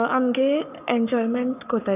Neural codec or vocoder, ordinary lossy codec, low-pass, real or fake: codec, 44.1 kHz, 7.8 kbps, Pupu-Codec; none; 3.6 kHz; fake